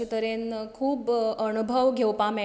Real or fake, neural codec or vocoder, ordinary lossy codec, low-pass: real; none; none; none